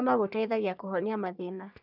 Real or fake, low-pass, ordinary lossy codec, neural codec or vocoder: fake; 5.4 kHz; none; codec, 44.1 kHz, 3.4 kbps, Pupu-Codec